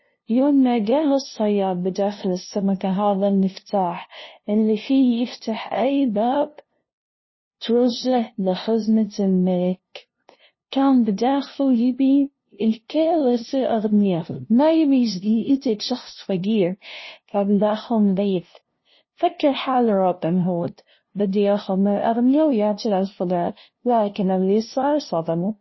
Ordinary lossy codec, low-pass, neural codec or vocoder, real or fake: MP3, 24 kbps; 7.2 kHz; codec, 16 kHz, 0.5 kbps, FunCodec, trained on LibriTTS, 25 frames a second; fake